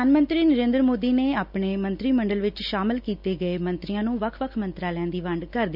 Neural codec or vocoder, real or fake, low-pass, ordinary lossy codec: none; real; 5.4 kHz; none